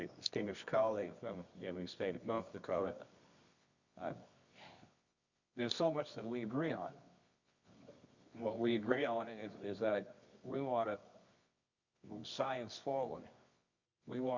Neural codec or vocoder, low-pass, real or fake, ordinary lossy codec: codec, 24 kHz, 0.9 kbps, WavTokenizer, medium music audio release; 7.2 kHz; fake; Opus, 64 kbps